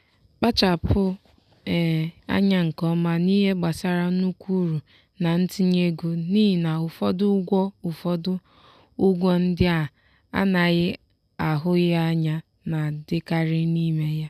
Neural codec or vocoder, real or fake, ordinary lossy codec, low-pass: none; real; none; 14.4 kHz